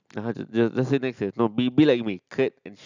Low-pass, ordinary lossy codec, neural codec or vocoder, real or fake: 7.2 kHz; none; none; real